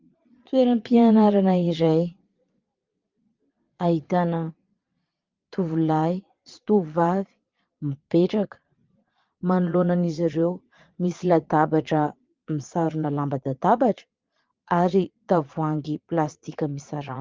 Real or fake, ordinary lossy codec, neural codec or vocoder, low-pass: fake; Opus, 32 kbps; vocoder, 22.05 kHz, 80 mel bands, Vocos; 7.2 kHz